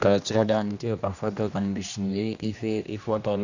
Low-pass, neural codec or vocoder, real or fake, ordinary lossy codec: 7.2 kHz; codec, 16 kHz, 1 kbps, X-Codec, HuBERT features, trained on general audio; fake; none